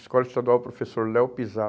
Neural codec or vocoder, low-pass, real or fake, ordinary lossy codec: none; none; real; none